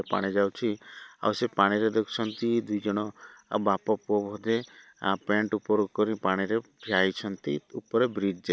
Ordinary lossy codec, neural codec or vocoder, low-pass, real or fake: none; none; none; real